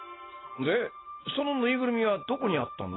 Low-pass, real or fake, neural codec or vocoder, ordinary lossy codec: 7.2 kHz; real; none; AAC, 16 kbps